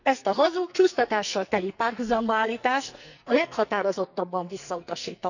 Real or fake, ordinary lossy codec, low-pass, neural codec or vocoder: fake; none; 7.2 kHz; codec, 44.1 kHz, 2.6 kbps, SNAC